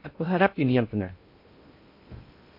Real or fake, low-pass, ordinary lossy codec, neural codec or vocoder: fake; 5.4 kHz; MP3, 32 kbps; codec, 16 kHz in and 24 kHz out, 0.6 kbps, FocalCodec, streaming, 2048 codes